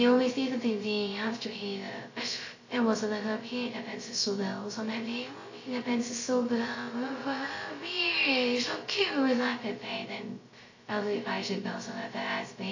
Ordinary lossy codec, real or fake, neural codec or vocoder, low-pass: AAC, 48 kbps; fake; codec, 16 kHz, 0.2 kbps, FocalCodec; 7.2 kHz